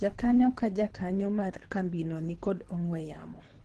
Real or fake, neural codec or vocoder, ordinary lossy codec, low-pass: fake; codec, 24 kHz, 3 kbps, HILCodec; Opus, 16 kbps; 10.8 kHz